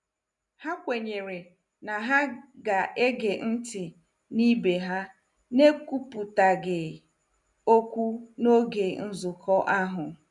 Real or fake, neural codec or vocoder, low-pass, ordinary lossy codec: real; none; 10.8 kHz; none